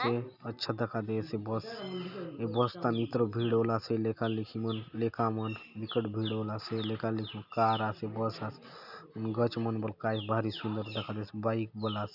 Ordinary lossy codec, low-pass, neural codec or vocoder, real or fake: none; 5.4 kHz; none; real